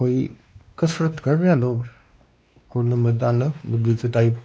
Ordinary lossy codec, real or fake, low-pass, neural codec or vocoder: none; fake; none; codec, 16 kHz, 2 kbps, X-Codec, WavLM features, trained on Multilingual LibriSpeech